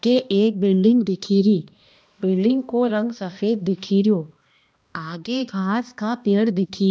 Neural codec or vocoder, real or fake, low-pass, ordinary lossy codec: codec, 16 kHz, 1 kbps, X-Codec, HuBERT features, trained on balanced general audio; fake; none; none